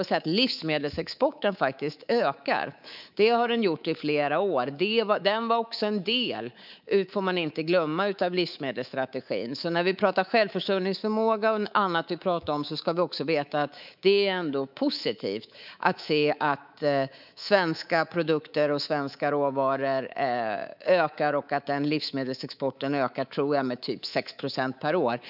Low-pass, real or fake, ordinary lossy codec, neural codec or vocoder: 5.4 kHz; fake; none; codec, 24 kHz, 3.1 kbps, DualCodec